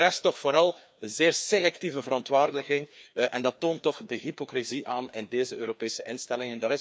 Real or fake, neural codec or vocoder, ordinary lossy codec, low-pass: fake; codec, 16 kHz, 2 kbps, FreqCodec, larger model; none; none